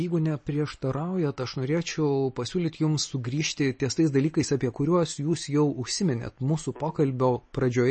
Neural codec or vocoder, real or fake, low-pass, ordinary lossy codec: none; real; 10.8 kHz; MP3, 32 kbps